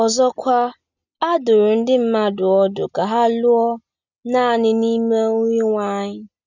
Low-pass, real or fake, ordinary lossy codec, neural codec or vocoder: 7.2 kHz; real; none; none